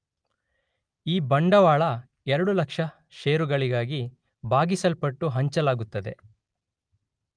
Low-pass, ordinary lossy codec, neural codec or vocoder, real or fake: 9.9 kHz; Opus, 32 kbps; none; real